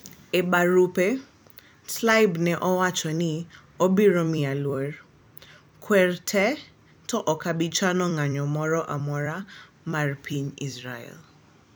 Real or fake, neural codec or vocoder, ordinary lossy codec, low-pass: fake; vocoder, 44.1 kHz, 128 mel bands every 256 samples, BigVGAN v2; none; none